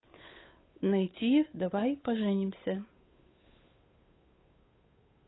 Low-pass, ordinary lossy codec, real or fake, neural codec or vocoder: 7.2 kHz; AAC, 16 kbps; fake; codec, 16 kHz, 8 kbps, FunCodec, trained on Chinese and English, 25 frames a second